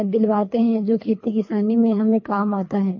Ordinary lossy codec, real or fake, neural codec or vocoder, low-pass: MP3, 32 kbps; fake; codec, 24 kHz, 3 kbps, HILCodec; 7.2 kHz